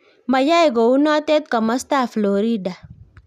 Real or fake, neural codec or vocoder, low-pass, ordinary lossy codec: real; none; 14.4 kHz; none